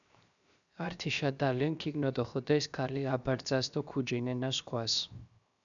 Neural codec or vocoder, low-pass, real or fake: codec, 16 kHz, 0.7 kbps, FocalCodec; 7.2 kHz; fake